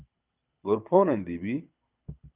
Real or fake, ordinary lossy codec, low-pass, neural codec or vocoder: fake; Opus, 24 kbps; 3.6 kHz; codec, 24 kHz, 6 kbps, HILCodec